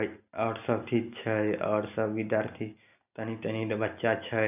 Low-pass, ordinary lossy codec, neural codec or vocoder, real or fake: 3.6 kHz; none; none; real